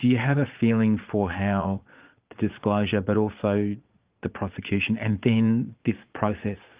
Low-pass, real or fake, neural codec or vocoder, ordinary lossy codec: 3.6 kHz; fake; vocoder, 22.05 kHz, 80 mel bands, Vocos; Opus, 24 kbps